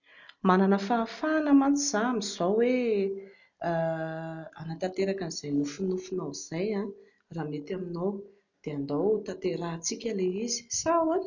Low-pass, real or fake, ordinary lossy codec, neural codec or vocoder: 7.2 kHz; real; none; none